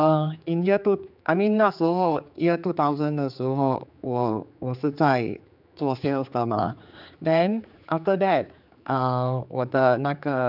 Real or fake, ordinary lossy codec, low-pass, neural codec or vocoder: fake; none; 5.4 kHz; codec, 16 kHz, 4 kbps, X-Codec, HuBERT features, trained on general audio